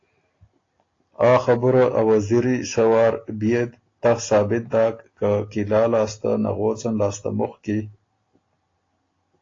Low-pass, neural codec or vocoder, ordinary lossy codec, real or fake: 7.2 kHz; none; AAC, 32 kbps; real